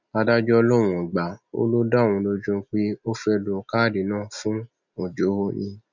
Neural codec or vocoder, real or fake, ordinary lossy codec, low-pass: none; real; none; 7.2 kHz